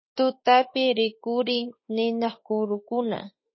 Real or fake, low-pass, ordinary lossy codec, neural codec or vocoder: fake; 7.2 kHz; MP3, 24 kbps; autoencoder, 48 kHz, 32 numbers a frame, DAC-VAE, trained on Japanese speech